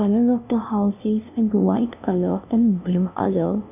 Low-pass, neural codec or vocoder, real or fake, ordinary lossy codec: 3.6 kHz; codec, 16 kHz, 0.5 kbps, FunCodec, trained on LibriTTS, 25 frames a second; fake; none